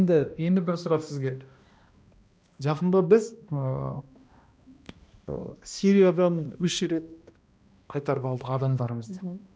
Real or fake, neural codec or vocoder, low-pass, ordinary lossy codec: fake; codec, 16 kHz, 1 kbps, X-Codec, HuBERT features, trained on balanced general audio; none; none